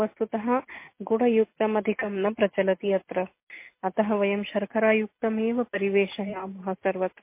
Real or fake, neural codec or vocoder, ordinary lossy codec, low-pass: real; none; MP3, 24 kbps; 3.6 kHz